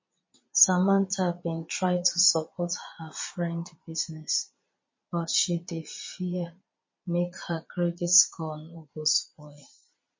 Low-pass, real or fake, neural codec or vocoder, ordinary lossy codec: 7.2 kHz; fake; vocoder, 22.05 kHz, 80 mel bands, Vocos; MP3, 32 kbps